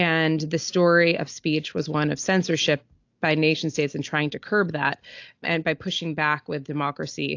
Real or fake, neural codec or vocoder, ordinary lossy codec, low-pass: real; none; AAC, 48 kbps; 7.2 kHz